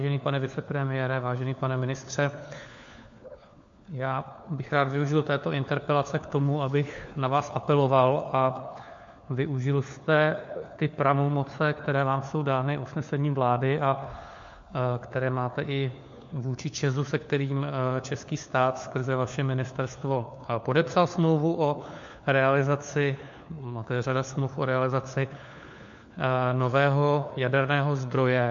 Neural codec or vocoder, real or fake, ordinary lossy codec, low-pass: codec, 16 kHz, 4 kbps, FunCodec, trained on LibriTTS, 50 frames a second; fake; MP3, 48 kbps; 7.2 kHz